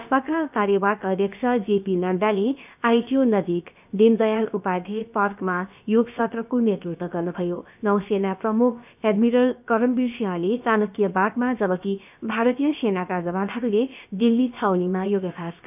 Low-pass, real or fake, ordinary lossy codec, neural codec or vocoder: 3.6 kHz; fake; none; codec, 16 kHz, about 1 kbps, DyCAST, with the encoder's durations